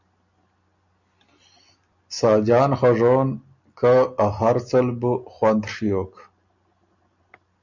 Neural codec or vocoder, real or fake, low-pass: none; real; 7.2 kHz